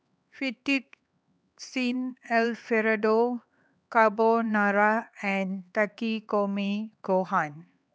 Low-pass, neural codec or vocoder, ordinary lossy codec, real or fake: none; codec, 16 kHz, 4 kbps, X-Codec, HuBERT features, trained on LibriSpeech; none; fake